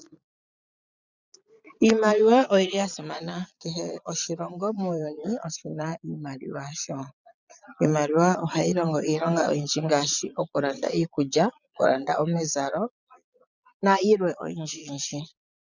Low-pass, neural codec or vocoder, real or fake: 7.2 kHz; vocoder, 22.05 kHz, 80 mel bands, Vocos; fake